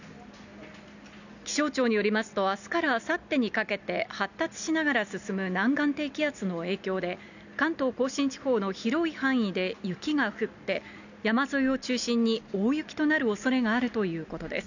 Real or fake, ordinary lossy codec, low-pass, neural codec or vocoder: real; none; 7.2 kHz; none